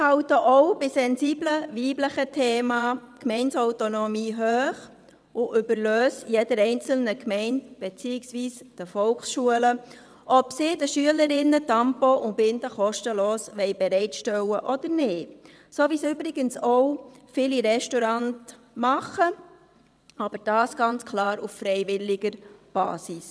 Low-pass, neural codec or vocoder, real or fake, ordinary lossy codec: none; vocoder, 22.05 kHz, 80 mel bands, WaveNeXt; fake; none